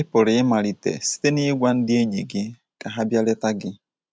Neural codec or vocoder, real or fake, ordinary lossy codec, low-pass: none; real; none; none